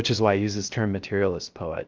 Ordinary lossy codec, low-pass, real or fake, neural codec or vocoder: Opus, 24 kbps; 7.2 kHz; fake; codec, 16 kHz, 0.3 kbps, FocalCodec